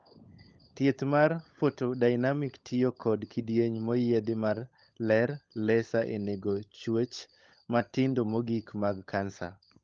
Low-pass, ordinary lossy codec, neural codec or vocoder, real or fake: 7.2 kHz; Opus, 24 kbps; codec, 16 kHz, 16 kbps, FunCodec, trained on LibriTTS, 50 frames a second; fake